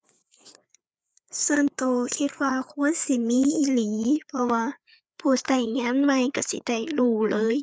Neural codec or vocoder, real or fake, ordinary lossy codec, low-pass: codec, 16 kHz, 4 kbps, FreqCodec, larger model; fake; none; none